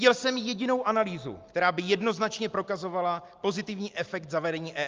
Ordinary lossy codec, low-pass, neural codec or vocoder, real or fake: Opus, 24 kbps; 7.2 kHz; none; real